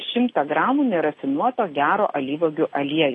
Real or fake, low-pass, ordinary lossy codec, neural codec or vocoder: real; 10.8 kHz; AAC, 32 kbps; none